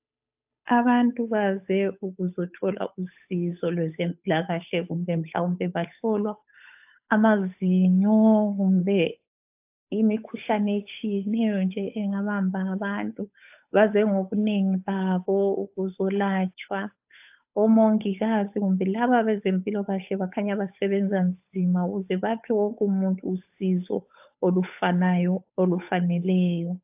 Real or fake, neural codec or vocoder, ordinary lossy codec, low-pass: fake; codec, 16 kHz, 8 kbps, FunCodec, trained on Chinese and English, 25 frames a second; AAC, 32 kbps; 3.6 kHz